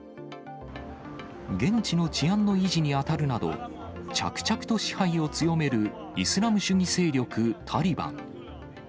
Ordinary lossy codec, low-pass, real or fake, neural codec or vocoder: none; none; real; none